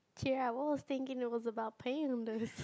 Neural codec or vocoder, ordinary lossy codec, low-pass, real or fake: none; none; none; real